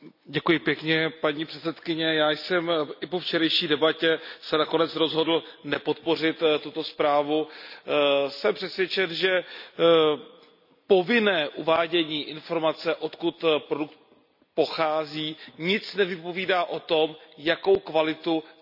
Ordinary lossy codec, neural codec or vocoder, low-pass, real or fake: none; none; 5.4 kHz; real